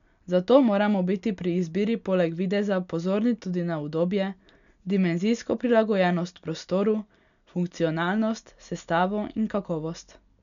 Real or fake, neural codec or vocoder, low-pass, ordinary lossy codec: real; none; 7.2 kHz; none